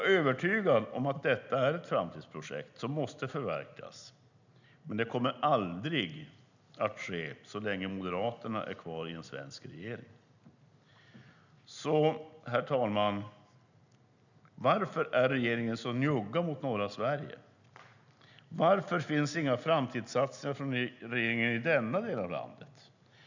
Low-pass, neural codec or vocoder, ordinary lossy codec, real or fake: 7.2 kHz; none; none; real